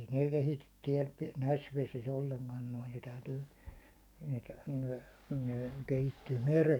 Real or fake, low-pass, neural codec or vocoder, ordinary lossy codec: fake; 19.8 kHz; codec, 44.1 kHz, 7.8 kbps, DAC; none